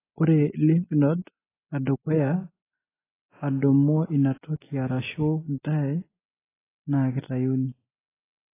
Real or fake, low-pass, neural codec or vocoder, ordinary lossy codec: real; 3.6 kHz; none; AAC, 16 kbps